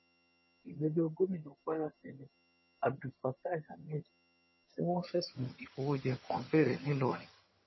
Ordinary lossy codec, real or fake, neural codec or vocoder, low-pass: MP3, 24 kbps; fake; vocoder, 22.05 kHz, 80 mel bands, HiFi-GAN; 7.2 kHz